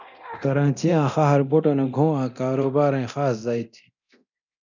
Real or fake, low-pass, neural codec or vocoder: fake; 7.2 kHz; codec, 24 kHz, 0.9 kbps, DualCodec